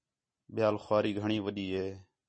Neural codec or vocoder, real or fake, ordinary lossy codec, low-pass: none; real; MP3, 32 kbps; 9.9 kHz